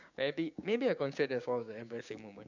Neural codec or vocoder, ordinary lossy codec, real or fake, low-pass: codec, 16 kHz, 6 kbps, DAC; none; fake; 7.2 kHz